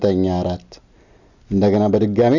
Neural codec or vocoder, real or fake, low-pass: none; real; 7.2 kHz